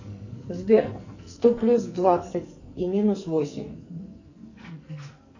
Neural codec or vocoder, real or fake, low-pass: codec, 44.1 kHz, 2.6 kbps, SNAC; fake; 7.2 kHz